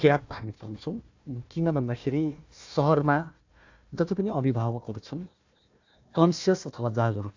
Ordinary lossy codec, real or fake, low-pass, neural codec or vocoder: AAC, 48 kbps; fake; 7.2 kHz; codec, 16 kHz, 1 kbps, FunCodec, trained on Chinese and English, 50 frames a second